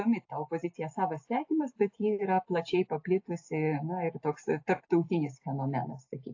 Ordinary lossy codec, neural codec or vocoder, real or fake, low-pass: AAC, 48 kbps; none; real; 7.2 kHz